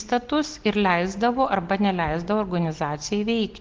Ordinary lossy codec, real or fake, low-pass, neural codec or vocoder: Opus, 16 kbps; real; 7.2 kHz; none